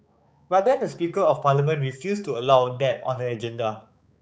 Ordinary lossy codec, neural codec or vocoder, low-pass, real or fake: none; codec, 16 kHz, 4 kbps, X-Codec, HuBERT features, trained on balanced general audio; none; fake